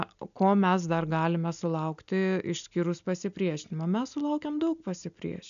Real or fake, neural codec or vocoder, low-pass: real; none; 7.2 kHz